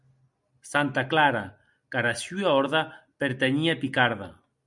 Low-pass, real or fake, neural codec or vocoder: 10.8 kHz; real; none